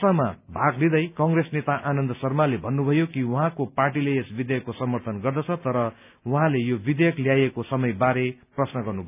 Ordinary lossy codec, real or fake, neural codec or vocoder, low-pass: none; real; none; 3.6 kHz